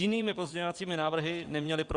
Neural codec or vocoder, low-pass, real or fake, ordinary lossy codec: vocoder, 22.05 kHz, 80 mel bands, WaveNeXt; 9.9 kHz; fake; Opus, 64 kbps